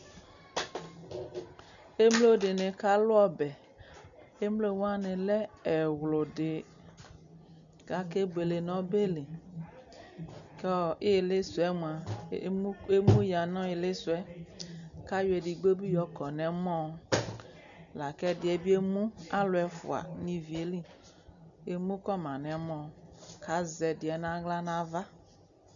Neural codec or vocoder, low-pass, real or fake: none; 7.2 kHz; real